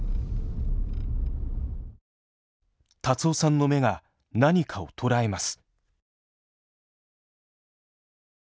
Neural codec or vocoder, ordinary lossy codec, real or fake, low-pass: none; none; real; none